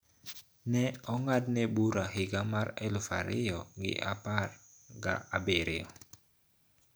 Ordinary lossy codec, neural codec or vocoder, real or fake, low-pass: none; none; real; none